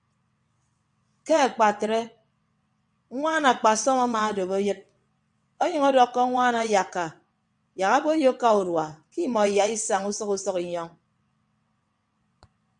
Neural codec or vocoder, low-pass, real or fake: vocoder, 22.05 kHz, 80 mel bands, WaveNeXt; 9.9 kHz; fake